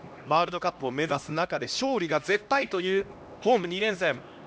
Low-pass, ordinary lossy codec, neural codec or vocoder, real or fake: none; none; codec, 16 kHz, 1 kbps, X-Codec, HuBERT features, trained on LibriSpeech; fake